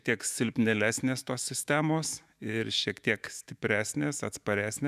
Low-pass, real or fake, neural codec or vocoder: 14.4 kHz; fake; autoencoder, 48 kHz, 128 numbers a frame, DAC-VAE, trained on Japanese speech